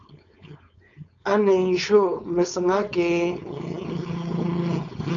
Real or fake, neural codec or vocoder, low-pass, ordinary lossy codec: fake; codec, 16 kHz, 4.8 kbps, FACodec; 7.2 kHz; MP3, 96 kbps